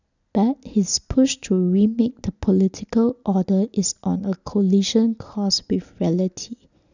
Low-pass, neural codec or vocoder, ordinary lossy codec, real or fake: 7.2 kHz; none; none; real